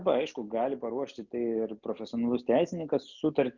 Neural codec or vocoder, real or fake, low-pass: none; real; 7.2 kHz